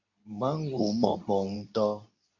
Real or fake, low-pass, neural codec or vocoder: fake; 7.2 kHz; codec, 24 kHz, 0.9 kbps, WavTokenizer, medium speech release version 2